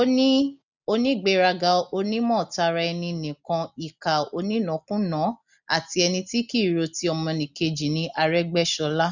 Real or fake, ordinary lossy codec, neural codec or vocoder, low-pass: real; none; none; 7.2 kHz